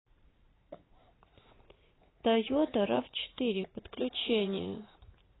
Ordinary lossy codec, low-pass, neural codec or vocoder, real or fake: AAC, 16 kbps; 7.2 kHz; vocoder, 44.1 kHz, 80 mel bands, Vocos; fake